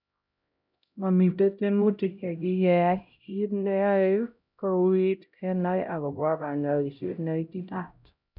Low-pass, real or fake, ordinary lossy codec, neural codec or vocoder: 5.4 kHz; fake; none; codec, 16 kHz, 0.5 kbps, X-Codec, HuBERT features, trained on LibriSpeech